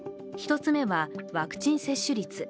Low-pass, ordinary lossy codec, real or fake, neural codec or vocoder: none; none; real; none